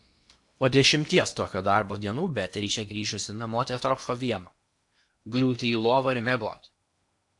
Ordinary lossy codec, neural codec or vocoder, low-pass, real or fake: AAC, 64 kbps; codec, 16 kHz in and 24 kHz out, 0.8 kbps, FocalCodec, streaming, 65536 codes; 10.8 kHz; fake